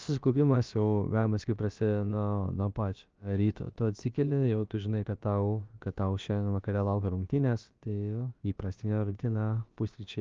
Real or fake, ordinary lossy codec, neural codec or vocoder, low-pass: fake; Opus, 24 kbps; codec, 16 kHz, about 1 kbps, DyCAST, with the encoder's durations; 7.2 kHz